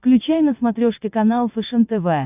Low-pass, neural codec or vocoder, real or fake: 3.6 kHz; vocoder, 22.05 kHz, 80 mel bands, WaveNeXt; fake